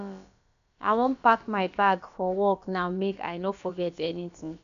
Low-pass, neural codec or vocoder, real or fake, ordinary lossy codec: 7.2 kHz; codec, 16 kHz, about 1 kbps, DyCAST, with the encoder's durations; fake; none